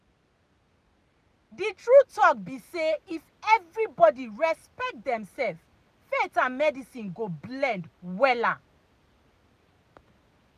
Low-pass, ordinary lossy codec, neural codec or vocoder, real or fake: 14.4 kHz; none; none; real